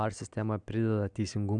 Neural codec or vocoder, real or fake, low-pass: none; real; 9.9 kHz